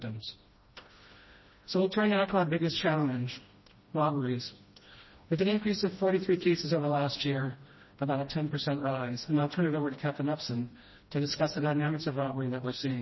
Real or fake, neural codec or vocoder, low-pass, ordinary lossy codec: fake; codec, 16 kHz, 1 kbps, FreqCodec, smaller model; 7.2 kHz; MP3, 24 kbps